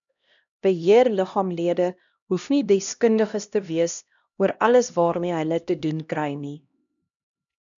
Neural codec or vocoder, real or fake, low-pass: codec, 16 kHz, 1 kbps, X-Codec, HuBERT features, trained on LibriSpeech; fake; 7.2 kHz